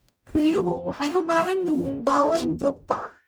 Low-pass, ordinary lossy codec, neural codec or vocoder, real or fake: none; none; codec, 44.1 kHz, 0.9 kbps, DAC; fake